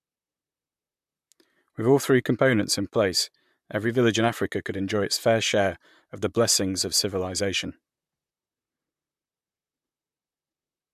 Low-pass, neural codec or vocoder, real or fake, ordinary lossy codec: 14.4 kHz; vocoder, 44.1 kHz, 128 mel bands, Pupu-Vocoder; fake; MP3, 96 kbps